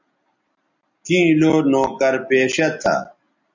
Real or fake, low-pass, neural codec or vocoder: real; 7.2 kHz; none